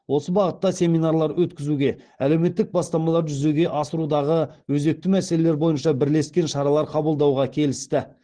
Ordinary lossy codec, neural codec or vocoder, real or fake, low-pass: Opus, 16 kbps; none; real; 9.9 kHz